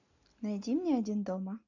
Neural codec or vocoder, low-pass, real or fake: none; 7.2 kHz; real